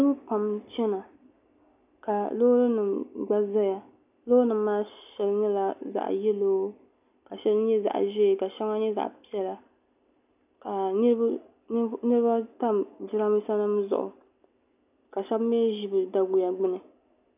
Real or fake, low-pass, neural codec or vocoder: real; 3.6 kHz; none